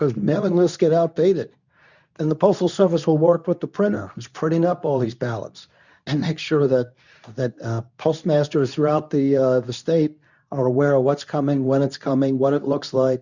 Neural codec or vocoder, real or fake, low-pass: codec, 24 kHz, 0.9 kbps, WavTokenizer, medium speech release version 2; fake; 7.2 kHz